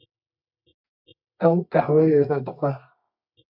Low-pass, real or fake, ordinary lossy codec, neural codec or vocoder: 5.4 kHz; fake; MP3, 48 kbps; codec, 24 kHz, 0.9 kbps, WavTokenizer, medium music audio release